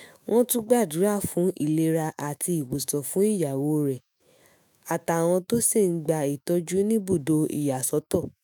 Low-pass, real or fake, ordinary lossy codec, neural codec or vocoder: none; fake; none; autoencoder, 48 kHz, 128 numbers a frame, DAC-VAE, trained on Japanese speech